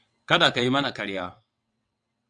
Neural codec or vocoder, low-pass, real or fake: vocoder, 22.05 kHz, 80 mel bands, WaveNeXt; 9.9 kHz; fake